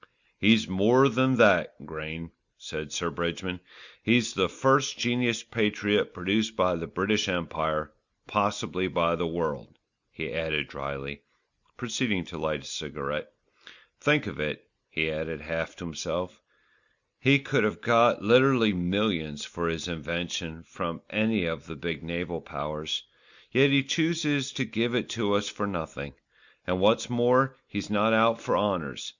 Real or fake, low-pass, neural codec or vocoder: real; 7.2 kHz; none